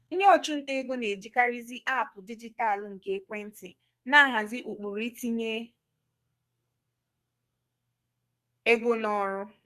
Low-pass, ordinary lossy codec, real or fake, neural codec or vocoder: 14.4 kHz; Opus, 64 kbps; fake; codec, 32 kHz, 1.9 kbps, SNAC